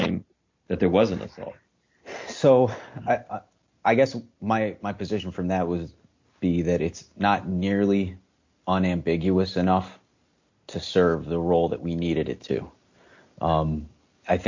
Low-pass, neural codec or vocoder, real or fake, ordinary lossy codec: 7.2 kHz; none; real; MP3, 48 kbps